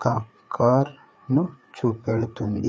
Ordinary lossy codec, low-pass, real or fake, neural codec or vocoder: none; none; fake; codec, 16 kHz, 4 kbps, FreqCodec, larger model